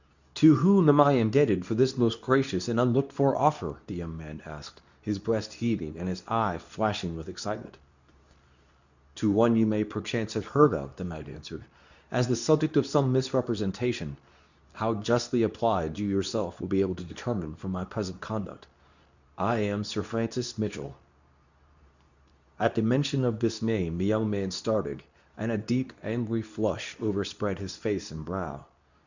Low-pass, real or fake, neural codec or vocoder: 7.2 kHz; fake; codec, 24 kHz, 0.9 kbps, WavTokenizer, medium speech release version 2